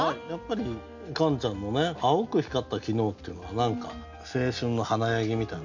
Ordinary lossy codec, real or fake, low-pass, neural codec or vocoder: none; real; 7.2 kHz; none